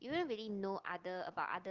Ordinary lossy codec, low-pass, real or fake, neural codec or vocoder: Opus, 16 kbps; 7.2 kHz; real; none